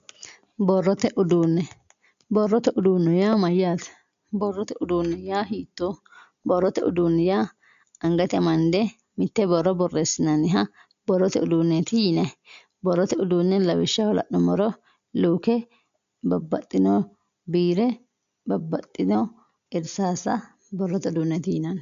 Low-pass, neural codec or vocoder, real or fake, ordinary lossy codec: 7.2 kHz; none; real; MP3, 64 kbps